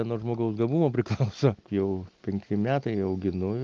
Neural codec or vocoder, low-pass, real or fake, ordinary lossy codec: none; 7.2 kHz; real; Opus, 24 kbps